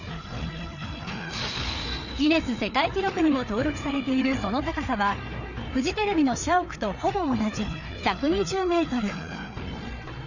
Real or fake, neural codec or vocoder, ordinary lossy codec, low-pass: fake; codec, 16 kHz, 4 kbps, FreqCodec, larger model; none; 7.2 kHz